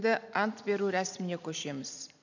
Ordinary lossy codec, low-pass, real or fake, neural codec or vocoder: none; 7.2 kHz; real; none